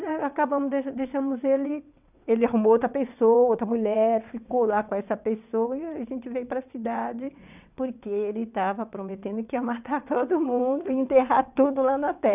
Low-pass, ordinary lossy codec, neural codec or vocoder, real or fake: 3.6 kHz; none; vocoder, 22.05 kHz, 80 mel bands, WaveNeXt; fake